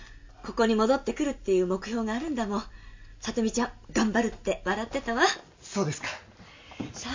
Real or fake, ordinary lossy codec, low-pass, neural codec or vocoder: real; MP3, 64 kbps; 7.2 kHz; none